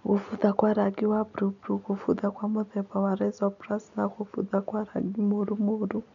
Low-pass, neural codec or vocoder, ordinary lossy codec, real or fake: 7.2 kHz; none; none; real